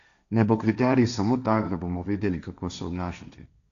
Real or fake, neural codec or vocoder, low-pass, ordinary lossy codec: fake; codec, 16 kHz, 1.1 kbps, Voila-Tokenizer; 7.2 kHz; none